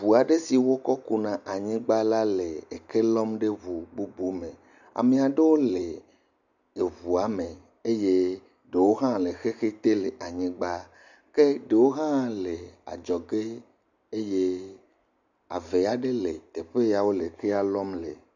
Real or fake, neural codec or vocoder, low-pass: real; none; 7.2 kHz